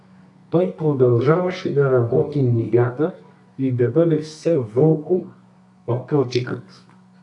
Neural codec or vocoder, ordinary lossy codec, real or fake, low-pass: codec, 24 kHz, 0.9 kbps, WavTokenizer, medium music audio release; AAC, 64 kbps; fake; 10.8 kHz